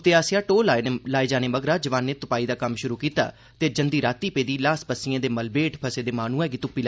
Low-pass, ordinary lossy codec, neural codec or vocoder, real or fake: none; none; none; real